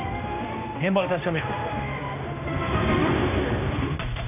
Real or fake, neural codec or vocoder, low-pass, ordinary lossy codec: fake; codec, 16 kHz, 1 kbps, X-Codec, HuBERT features, trained on general audio; 3.6 kHz; none